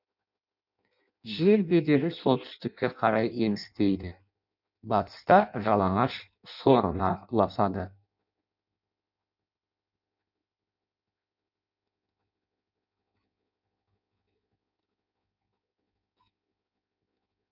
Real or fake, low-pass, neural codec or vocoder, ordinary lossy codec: fake; 5.4 kHz; codec, 16 kHz in and 24 kHz out, 0.6 kbps, FireRedTTS-2 codec; none